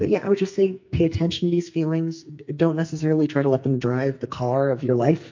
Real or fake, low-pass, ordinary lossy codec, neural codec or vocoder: fake; 7.2 kHz; MP3, 48 kbps; codec, 44.1 kHz, 2.6 kbps, SNAC